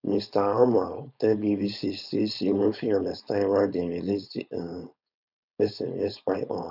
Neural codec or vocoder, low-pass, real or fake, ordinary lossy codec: codec, 16 kHz, 4.8 kbps, FACodec; 5.4 kHz; fake; none